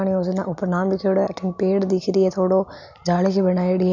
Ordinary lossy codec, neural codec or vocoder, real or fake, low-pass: none; none; real; 7.2 kHz